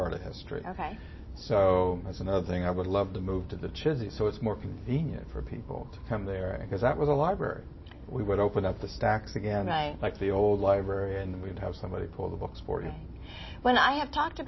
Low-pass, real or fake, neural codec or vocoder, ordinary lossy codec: 7.2 kHz; real; none; MP3, 24 kbps